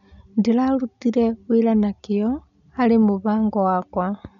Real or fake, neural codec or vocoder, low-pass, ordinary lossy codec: real; none; 7.2 kHz; none